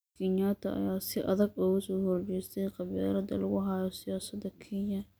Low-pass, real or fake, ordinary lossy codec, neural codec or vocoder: none; real; none; none